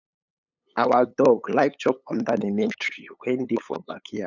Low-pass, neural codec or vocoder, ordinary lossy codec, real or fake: 7.2 kHz; codec, 16 kHz, 8 kbps, FunCodec, trained on LibriTTS, 25 frames a second; none; fake